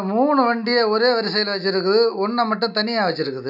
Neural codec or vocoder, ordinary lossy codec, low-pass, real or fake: none; none; 5.4 kHz; real